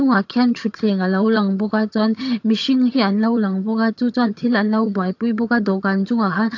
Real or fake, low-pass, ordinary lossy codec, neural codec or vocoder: fake; 7.2 kHz; AAC, 48 kbps; vocoder, 22.05 kHz, 80 mel bands, HiFi-GAN